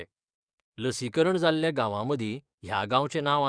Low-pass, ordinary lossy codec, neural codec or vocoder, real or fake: 9.9 kHz; none; codec, 44.1 kHz, 7.8 kbps, DAC; fake